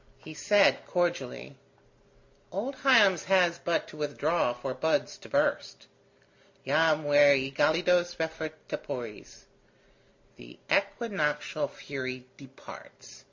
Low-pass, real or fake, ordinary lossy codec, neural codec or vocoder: 7.2 kHz; real; MP3, 48 kbps; none